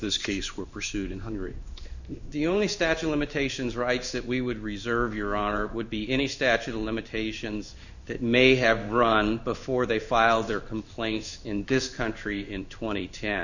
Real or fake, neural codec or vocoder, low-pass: fake; codec, 16 kHz in and 24 kHz out, 1 kbps, XY-Tokenizer; 7.2 kHz